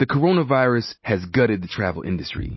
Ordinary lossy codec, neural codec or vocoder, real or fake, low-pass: MP3, 24 kbps; none; real; 7.2 kHz